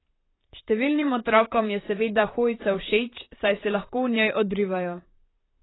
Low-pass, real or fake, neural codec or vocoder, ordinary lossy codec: 7.2 kHz; fake; vocoder, 44.1 kHz, 128 mel bands, Pupu-Vocoder; AAC, 16 kbps